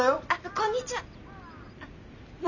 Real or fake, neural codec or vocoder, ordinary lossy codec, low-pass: real; none; none; 7.2 kHz